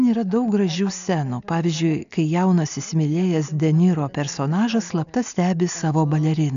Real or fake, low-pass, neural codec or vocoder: real; 7.2 kHz; none